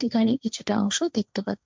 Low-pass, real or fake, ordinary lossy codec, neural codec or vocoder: none; fake; none; codec, 16 kHz, 1.1 kbps, Voila-Tokenizer